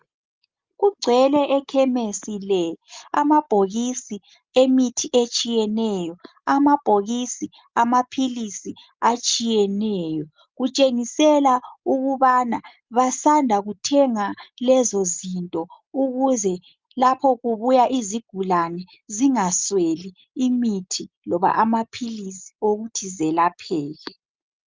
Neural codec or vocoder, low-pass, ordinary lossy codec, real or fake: none; 7.2 kHz; Opus, 32 kbps; real